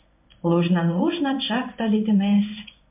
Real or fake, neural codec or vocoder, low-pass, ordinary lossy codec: fake; codec, 16 kHz in and 24 kHz out, 1 kbps, XY-Tokenizer; 3.6 kHz; MP3, 32 kbps